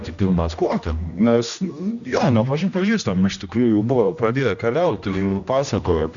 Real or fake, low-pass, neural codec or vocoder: fake; 7.2 kHz; codec, 16 kHz, 1 kbps, X-Codec, HuBERT features, trained on general audio